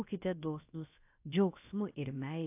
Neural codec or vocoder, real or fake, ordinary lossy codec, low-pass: codec, 16 kHz, about 1 kbps, DyCAST, with the encoder's durations; fake; AAC, 32 kbps; 3.6 kHz